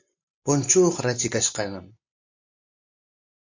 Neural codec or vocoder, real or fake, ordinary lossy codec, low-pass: none; real; AAC, 48 kbps; 7.2 kHz